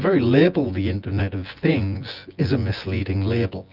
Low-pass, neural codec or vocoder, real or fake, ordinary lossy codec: 5.4 kHz; vocoder, 24 kHz, 100 mel bands, Vocos; fake; Opus, 24 kbps